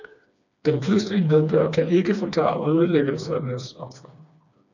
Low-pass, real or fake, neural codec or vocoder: 7.2 kHz; fake; codec, 16 kHz, 2 kbps, FreqCodec, smaller model